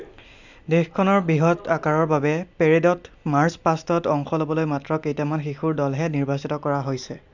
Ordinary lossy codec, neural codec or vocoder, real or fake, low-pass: none; none; real; 7.2 kHz